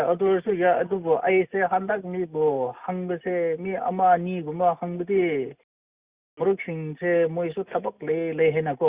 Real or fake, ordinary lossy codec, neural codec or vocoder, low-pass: real; Opus, 64 kbps; none; 3.6 kHz